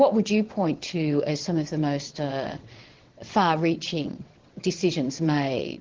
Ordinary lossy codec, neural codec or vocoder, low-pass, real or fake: Opus, 16 kbps; vocoder, 22.05 kHz, 80 mel bands, WaveNeXt; 7.2 kHz; fake